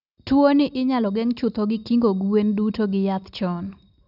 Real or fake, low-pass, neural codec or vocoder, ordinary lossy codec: real; 5.4 kHz; none; none